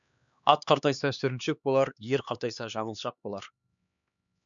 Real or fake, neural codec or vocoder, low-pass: fake; codec, 16 kHz, 2 kbps, X-Codec, HuBERT features, trained on LibriSpeech; 7.2 kHz